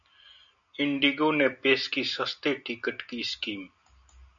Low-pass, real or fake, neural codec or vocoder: 7.2 kHz; real; none